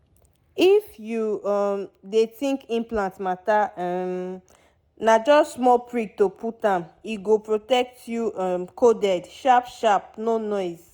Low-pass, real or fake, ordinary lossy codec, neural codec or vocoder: none; real; none; none